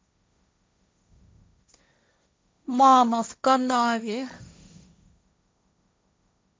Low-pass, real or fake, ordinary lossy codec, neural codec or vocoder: none; fake; none; codec, 16 kHz, 1.1 kbps, Voila-Tokenizer